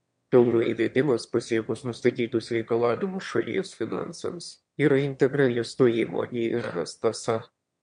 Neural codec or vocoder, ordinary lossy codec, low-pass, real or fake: autoencoder, 22.05 kHz, a latent of 192 numbers a frame, VITS, trained on one speaker; MP3, 64 kbps; 9.9 kHz; fake